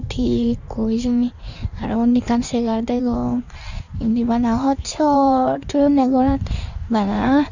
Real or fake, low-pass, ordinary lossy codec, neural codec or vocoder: fake; 7.2 kHz; none; codec, 16 kHz in and 24 kHz out, 1.1 kbps, FireRedTTS-2 codec